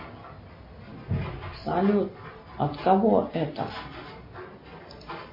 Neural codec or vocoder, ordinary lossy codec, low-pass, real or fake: none; MP3, 24 kbps; 5.4 kHz; real